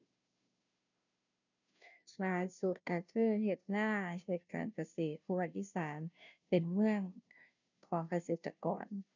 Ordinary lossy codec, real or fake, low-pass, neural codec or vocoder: none; fake; 7.2 kHz; codec, 16 kHz, 0.5 kbps, FunCodec, trained on Chinese and English, 25 frames a second